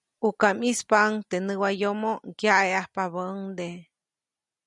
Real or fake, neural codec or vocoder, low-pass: real; none; 10.8 kHz